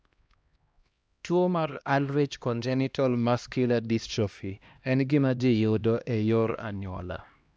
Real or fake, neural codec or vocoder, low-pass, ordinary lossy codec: fake; codec, 16 kHz, 1 kbps, X-Codec, HuBERT features, trained on LibriSpeech; none; none